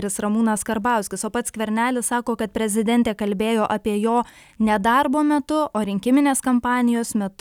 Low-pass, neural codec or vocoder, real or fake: 19.8 kHz; none; real